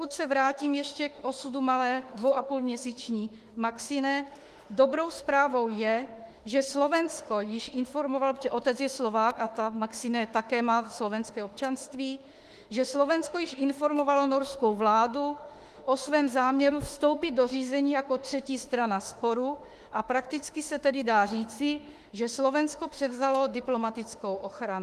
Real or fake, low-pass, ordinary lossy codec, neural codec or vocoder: fake; 14.4 kHz; Opus, 24 kbps; autoencoder, 48 kHz, 32 numbers a frame, DAC-VAE, trained on Japanese speech